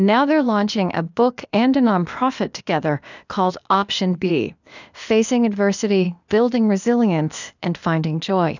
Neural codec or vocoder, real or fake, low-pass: codec, 16 kHz, 0.8 kbps, ZipCodec; fake; 7.2 kHz